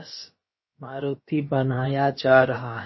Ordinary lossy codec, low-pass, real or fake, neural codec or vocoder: MP3, 24 kbps; 7.2 kHz; fake; codec, 16 kHz, about 1 kbps, DyCAST, with the encoder's durations